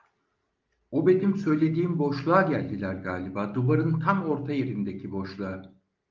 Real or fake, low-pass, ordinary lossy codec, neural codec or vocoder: real; 7.2 kHz; Opus, 24 kbps; none